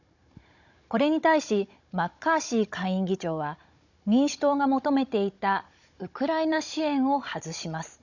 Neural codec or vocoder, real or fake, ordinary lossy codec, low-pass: codec, 16 kHz, 16 kbps, FunCodec, trained on Chinese and English, 50 frames a second; fake; none; 7.2 kHz